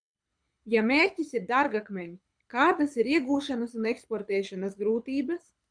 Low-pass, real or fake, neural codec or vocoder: 9.9 kHz; fake; codec, 24 kHz, 6 kbps, HILCodec